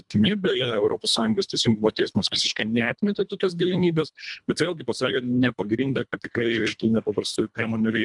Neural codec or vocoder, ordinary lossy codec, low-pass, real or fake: codec, 24 kHz, 1.5 kbps, HILCodec; MP3, 96 kbps; 10.8 kHz; fake